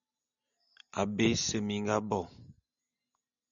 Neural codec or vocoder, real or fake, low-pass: none; real; 7.2 kHz